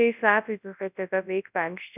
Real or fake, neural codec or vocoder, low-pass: fake; codec, 24 kHz, 0.9 kbps, WavTokenizer, large speech release; 3.6 kHz